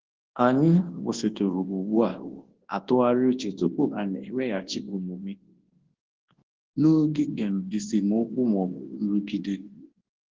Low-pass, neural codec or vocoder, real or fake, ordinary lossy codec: 7.2 kHz; codec, 24 kHz, 0.9 kbps, WavTokenizer, large speech release; fake; Opus, 16 kbps